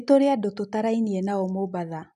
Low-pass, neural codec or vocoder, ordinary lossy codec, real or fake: 10.8 kHz; none; none; real